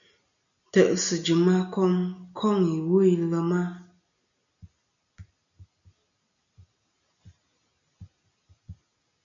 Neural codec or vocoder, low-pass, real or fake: none; 7.2 kHz; real